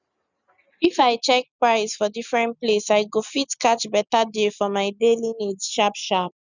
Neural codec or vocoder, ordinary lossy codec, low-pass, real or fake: none; none; 7.2 kHz; real